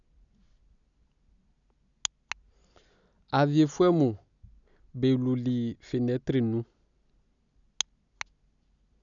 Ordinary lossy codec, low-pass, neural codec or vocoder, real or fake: none; 7.2 kHz; none; real